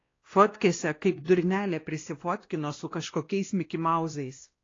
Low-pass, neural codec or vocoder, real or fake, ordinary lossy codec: 7.2 kHz; codec, 16 kHz, 1 kbps, X-Codec, WavLM features, trained on Multilingual LibriSpeech; fake; AAC, 32 kbps